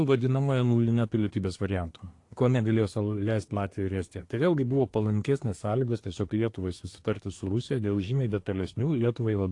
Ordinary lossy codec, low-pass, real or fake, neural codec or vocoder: AAC, 48 kbps; 10.8 kHz; fake; codec, 24 kHz, 1 kbps, SNAC